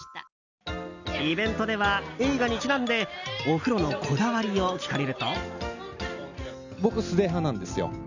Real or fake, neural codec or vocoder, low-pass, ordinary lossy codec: real; none; 7.2 kHz; none